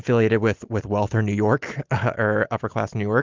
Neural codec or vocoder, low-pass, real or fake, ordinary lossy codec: none; 7.2 kHz; real; Opus, 32 kbps